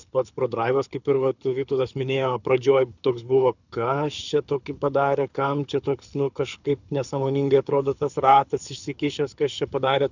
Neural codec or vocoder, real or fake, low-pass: codec, 24 kHz, 6 kbps, HILCodec; fake; 7.2 kHz